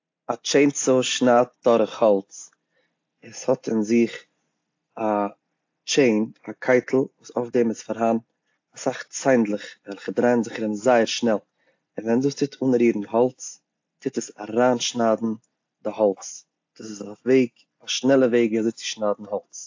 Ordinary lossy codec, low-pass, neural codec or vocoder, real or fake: AAC, 48 kbps; 7.2 kHz; none; real